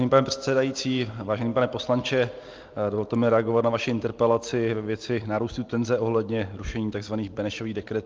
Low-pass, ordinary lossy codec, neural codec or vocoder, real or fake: 7.2 kHz; Opus, 24 kbps; none; real